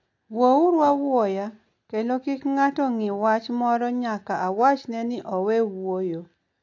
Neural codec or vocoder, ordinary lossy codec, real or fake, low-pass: none; AAC, 48 kbps; real; 7.2 kHz